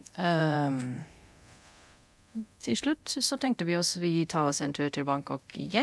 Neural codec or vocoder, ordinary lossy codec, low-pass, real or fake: codec, 24 kHz, 0.9 kbps, DualCodec; none; none; fake